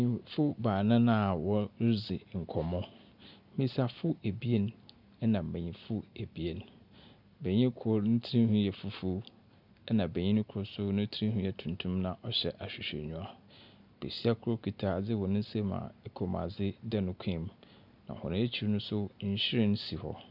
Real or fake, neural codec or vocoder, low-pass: real; none; 5.4 kHz